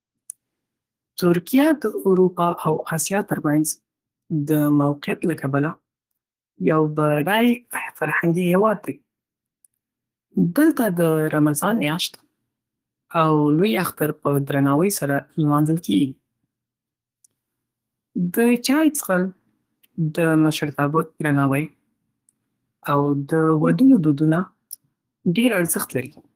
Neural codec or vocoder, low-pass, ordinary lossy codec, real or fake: codec, 32 kHz, 1.9 kbps, SNAC; 14.4 kHz; Opus, 24 kbps; fake